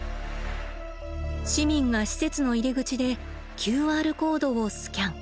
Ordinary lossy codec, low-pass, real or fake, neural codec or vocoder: none; none; real; none